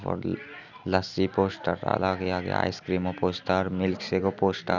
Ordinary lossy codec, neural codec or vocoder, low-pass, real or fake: none; none; 7.2 kHz; real